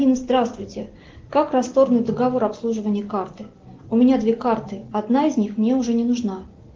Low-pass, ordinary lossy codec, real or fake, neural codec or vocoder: 7.2 kHz; Opus, 16 kbps; real; none